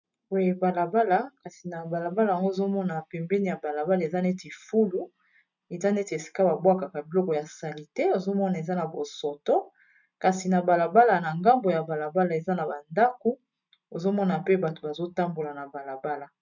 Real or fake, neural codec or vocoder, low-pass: real; none; 7.2 kHz